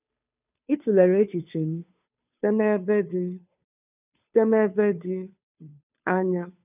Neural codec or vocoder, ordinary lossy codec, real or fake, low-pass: codec, 16 kHz, 2 kbps, FunCodec, trained on Chinese and English, 25 frames a second; none; fake; 3.6 kHz